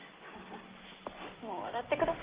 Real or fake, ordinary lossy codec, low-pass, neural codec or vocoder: real; Opus, 16 kbps; 3.6 kHz; none